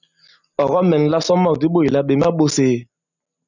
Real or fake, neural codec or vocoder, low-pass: real; none; 7.2 kHz